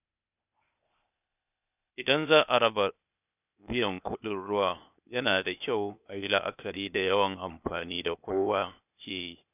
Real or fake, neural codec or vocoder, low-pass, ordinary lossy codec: fake; codec, 16 kHz, 0.8 kbps, ZipCodec; 3.6 kHz; none